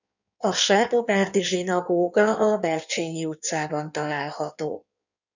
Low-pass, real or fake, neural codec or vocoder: 7.2 kHz; fake; codec, 16 kHz in and 24 kHz out, 1.1 kbps, FireRedTTS-2 codec